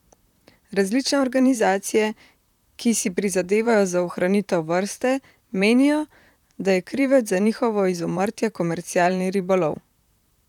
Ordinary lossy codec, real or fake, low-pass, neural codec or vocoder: none; fake; 19.8 kHz; vocoder, 44.1 kHz, 128 mel bands, Pupu-Vocoder